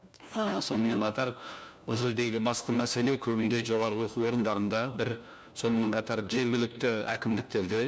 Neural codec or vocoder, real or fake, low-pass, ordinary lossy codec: codec, 16 kHz, 1 kbps, FunCodec, trained on LibriTTS, 50 frames a second; fake; none; none